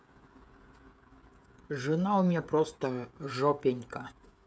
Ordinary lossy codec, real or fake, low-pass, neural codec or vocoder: none; fake; none; codec, 16 kHz, 16 kbps, FreqCodec, smaller model